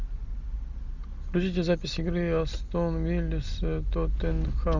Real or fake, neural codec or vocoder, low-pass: real; none; 7.2 kHz